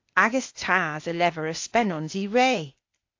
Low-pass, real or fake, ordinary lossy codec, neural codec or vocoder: 7.2 kHz; fake; AAC, 48 kbps; codec, 16 kHz, 0.8 kbps, ZipCodec